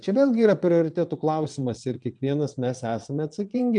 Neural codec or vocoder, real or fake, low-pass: vocoder, 22.05 kHz, 80 mel bands, WaveNeXt; fake; 9.9 kHz